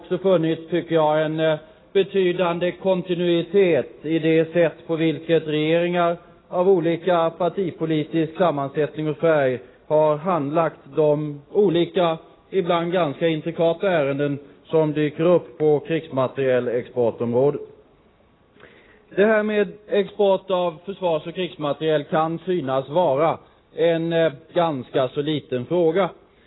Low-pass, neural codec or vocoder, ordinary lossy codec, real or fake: 7.2 kHz; none; AAC, 16 kbps; real